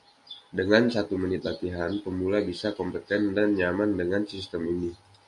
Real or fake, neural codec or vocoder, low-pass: real; none; 10.8 kHz